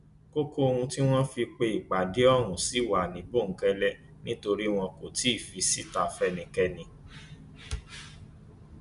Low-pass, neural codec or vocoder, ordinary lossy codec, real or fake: 10.8 kHz; none; none; real